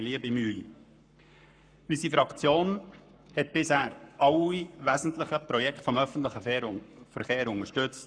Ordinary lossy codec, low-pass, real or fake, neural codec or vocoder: none; 9.9 kHz; fake; codec, 44.1 kHz, 7.8 kbps, Pupu-Codec